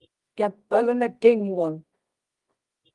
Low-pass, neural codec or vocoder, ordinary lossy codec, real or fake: 10.8 kHz; codec, 24 kHz, 0.9 kbps, WavTokenizer, medium music audio release; Opus, 24 kbps; fake